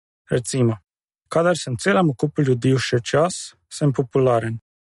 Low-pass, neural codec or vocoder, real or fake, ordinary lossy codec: 19.8 kHz; none; real; MP3, 48 kbps